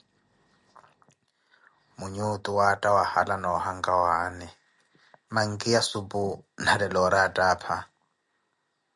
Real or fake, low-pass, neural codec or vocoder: real; 10.8 kHz; none